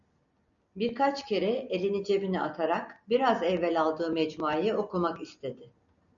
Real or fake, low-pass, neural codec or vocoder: real; 7.2 kHz; none